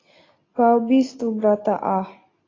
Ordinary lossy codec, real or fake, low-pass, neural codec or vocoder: AAC, 32 kbps; real; 7.2 kHz; none